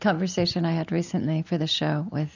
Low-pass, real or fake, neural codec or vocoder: 7.2 kHz; real; none